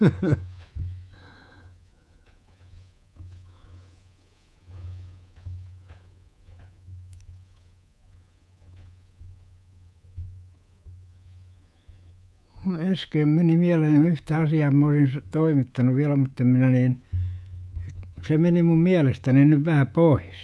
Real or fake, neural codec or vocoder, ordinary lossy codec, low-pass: fake; codec, 24 kHz, 3.1 kbps, DualCodec; none; none